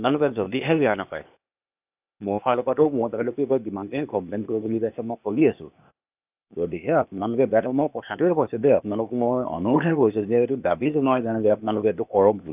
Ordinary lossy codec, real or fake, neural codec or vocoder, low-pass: none; fake; codec, 16 kHz, 0.8 kbps, ZipCodec; 3.6 kHz